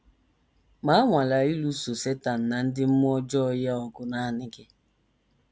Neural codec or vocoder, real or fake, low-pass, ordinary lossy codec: none; real; none; none